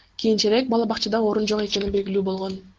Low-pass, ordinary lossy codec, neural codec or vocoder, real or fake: 7.2 kHz; Opus, 16 kbps; none; real